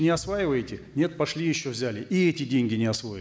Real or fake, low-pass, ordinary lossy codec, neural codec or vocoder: real; none; none; none